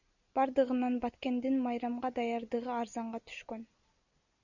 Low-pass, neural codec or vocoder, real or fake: 7.2 kHz; none; real